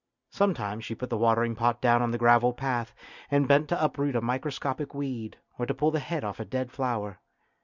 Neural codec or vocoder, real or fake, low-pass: none; real; 7.2 kHz